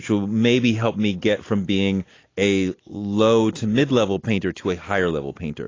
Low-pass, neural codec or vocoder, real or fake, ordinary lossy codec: 7.2 kHz; none; real; AAC, 32 kbps